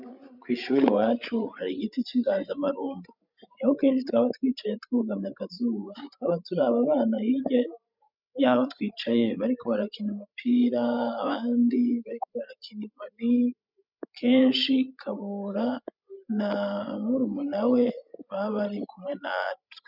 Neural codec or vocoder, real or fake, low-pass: codec, 16 kHz, 16 kbps, FreqCodec, larger model; fake; 5.4 kHz